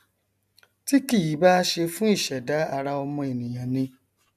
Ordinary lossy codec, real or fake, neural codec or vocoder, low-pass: none; real; none; 14.4 kHz